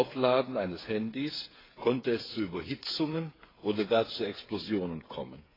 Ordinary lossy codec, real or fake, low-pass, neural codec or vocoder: AAC, 24 kbps; fake; 5.4 kHz; codec, 16 kHz, 8 kbps, FreqCodec, smaller model